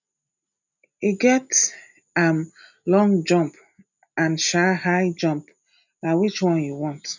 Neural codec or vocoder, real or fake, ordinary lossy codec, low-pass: none; real; none; 7.2 kHz